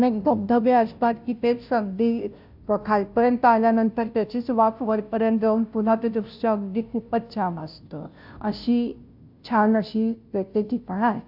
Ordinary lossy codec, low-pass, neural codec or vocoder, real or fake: AAC, 48 kbps; 5.4 kHz; codec, 16 kHz, 0.5 kbps, FunCodec, trained on Chinese and English, 25 frames a second; fake